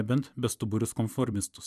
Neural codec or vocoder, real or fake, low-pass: vocoder, 44.1 kHz, 128 mel bands every 256 samples, BigVGAN v2; fake; 14.4 kHz